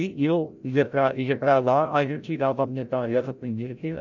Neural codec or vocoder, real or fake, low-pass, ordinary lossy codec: codec, 16 kHz, 0.5 kbps, FreqCodec, larger model; fake; 7.2 kHz; none